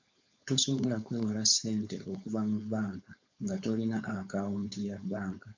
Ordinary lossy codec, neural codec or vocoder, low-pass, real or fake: MP3, 64 kbps; codec, 16 kHz, 4.8 kbps, FACodec; 7.2 kHz; fake